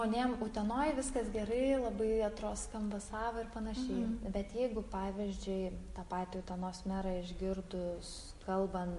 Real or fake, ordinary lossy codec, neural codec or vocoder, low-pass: real; MP3, 48 kbps; none; 14.4 kHz